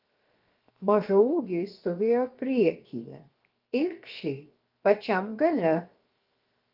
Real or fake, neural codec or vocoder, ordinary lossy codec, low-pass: fake; codec, 16 kHz, 0.7 kbps, FocalCodec; Opus, 24 kbps; 5.4 kHz